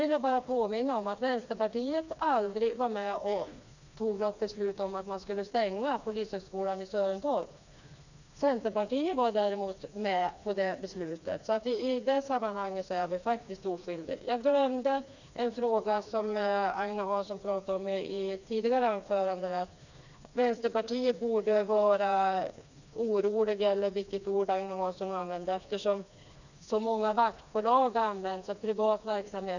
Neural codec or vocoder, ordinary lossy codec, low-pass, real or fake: codec, 16 kHz, 2 kbps, FreqCodec, smaller model; none; 7.2 kHz; fake